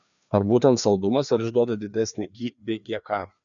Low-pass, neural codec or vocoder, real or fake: 7.2 kHz; codec, 16 kHz, 2 kbps, FreqCodec, larger model; fake